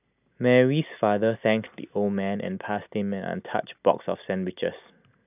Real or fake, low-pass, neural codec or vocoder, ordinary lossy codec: real; 3.6 kHz; none; none